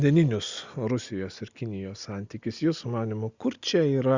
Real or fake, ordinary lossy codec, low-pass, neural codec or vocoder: real; Opus, 64 kbps; 7.2 kHz; none